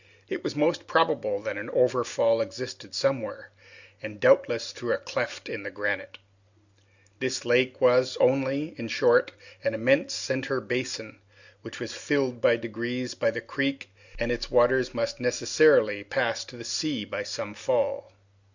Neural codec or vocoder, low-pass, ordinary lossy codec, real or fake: none; 7.2 kHz; Opus, 64 kbps; real